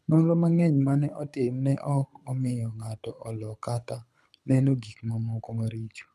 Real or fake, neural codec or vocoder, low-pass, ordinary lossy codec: fake; codec, 24 kHz, 6 kbps, HILCodec; none; none